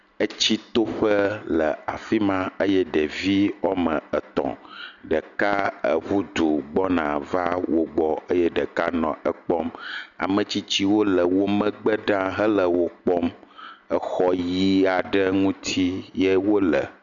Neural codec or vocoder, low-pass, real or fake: none; 7.2 kHz; real